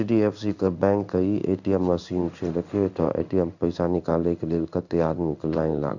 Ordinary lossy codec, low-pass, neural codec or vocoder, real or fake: none; 7.2 kHz; codec, 16 kHz in and 24 kHz out, 1 kbps, XY-Tokenizer; fake